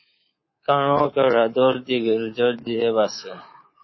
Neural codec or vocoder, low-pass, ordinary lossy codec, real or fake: codec, 24 kHz, 3.1 kbps, DualCodec; 7.2 kHz; MP3, 24 kbps; fake